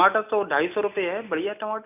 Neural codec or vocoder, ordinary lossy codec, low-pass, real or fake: none; none; 3.6 kHz; real